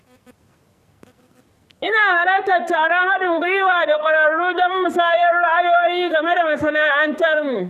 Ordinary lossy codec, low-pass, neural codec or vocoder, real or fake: none; 14.4 kHz; codec, 44.1 kHz, 2.6 kbps, SNAC; fake